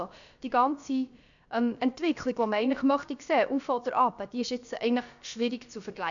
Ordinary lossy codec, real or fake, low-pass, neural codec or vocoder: none; fake; 7.2 kHz; codec, 16 kHz, about 1 kbps, DyCAST, with the encoder's durations